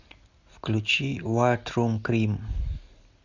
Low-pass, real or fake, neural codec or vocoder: 7.2 kHz; real; none